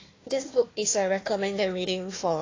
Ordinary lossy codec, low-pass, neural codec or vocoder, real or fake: AAC, 32 kbps; 7.2 kHz; codec, 16 kHz, 1 kbps, FunCodec, trained on Chinese and English, 50 frames a second; fake